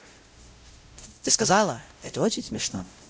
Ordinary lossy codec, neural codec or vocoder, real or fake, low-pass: none; codec, 16 kHz, 0.5 kbps, X-Codec, WavLM features, trained on Multilingual LibriSpeech; fake; none